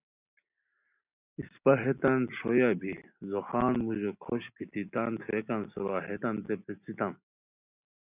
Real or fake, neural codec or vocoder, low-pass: real; none; 3.6 kHz